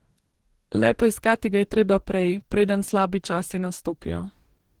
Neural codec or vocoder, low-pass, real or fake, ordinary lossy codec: codec, 44.1 kHz, 2.6 kbps, DAC; 19.8 kHz; fake; Opus, 16 kbps